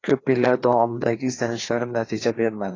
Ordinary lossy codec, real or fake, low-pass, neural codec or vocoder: AAC, 32 kbps; fake; 7.2 kHz; codec, 16 kHz, 2 kbps, FreqCodec, larger model